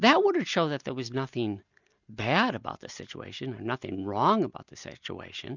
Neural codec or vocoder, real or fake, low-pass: none; real; 7.2 kHz